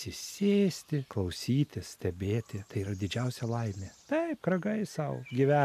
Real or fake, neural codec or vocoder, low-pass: real; none; 14.4 kHz